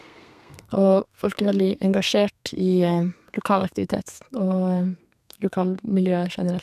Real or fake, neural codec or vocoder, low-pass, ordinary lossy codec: fake; codec, 32 kHz, 1.9 kbps, SNAC; 14.4 kHz; none